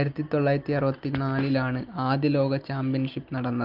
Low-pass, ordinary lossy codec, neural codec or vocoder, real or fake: 5.4 kHz; Opus, 32 kbps; none; real